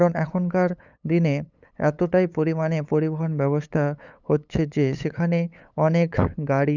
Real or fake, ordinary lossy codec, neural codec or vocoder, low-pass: fake; none; codec, 16 kHz, 8 kbps, FunCodec, trained on LibriTTS, 25 frames a second; 7.2 kHz